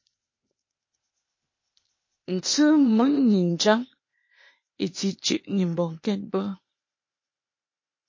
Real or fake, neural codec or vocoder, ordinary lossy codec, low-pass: fake; codec, 16 kHz, 0.8 kbps, ZipCodec; MP3, 32 kbps; 7.2 kHz